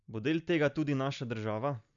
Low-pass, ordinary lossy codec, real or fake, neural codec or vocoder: 7.2 kHz; none; real; none